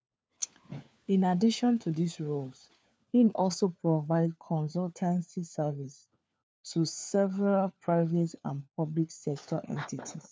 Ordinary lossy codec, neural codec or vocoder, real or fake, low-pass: none; codec, 16 kHz, 4 kbps, FunCodec, trained on LibriTTS, 50 frames a second; fake; none